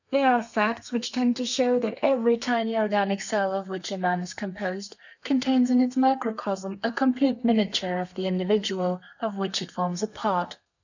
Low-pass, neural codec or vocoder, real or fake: 7.2 kHz; codec, 32 kHz, 1.9 kbps, SNAC; fake